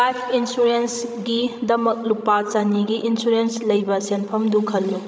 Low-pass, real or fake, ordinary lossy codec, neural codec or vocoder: none; fake; none; codec, 16 kHz, 16 kbps, FreqCodec, larger model